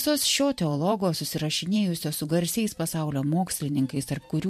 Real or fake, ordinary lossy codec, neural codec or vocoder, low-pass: fake; MP3, 64 kbps; vocoder, 44.1 kHz, 128 mel bands every 512 samples, BigVGAN v2; 14.4 kHz